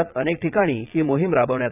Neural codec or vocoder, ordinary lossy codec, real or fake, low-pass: vocoder, 22.05 kHz, 80 mel bands, Vocos; none; fake; 3.6 kHz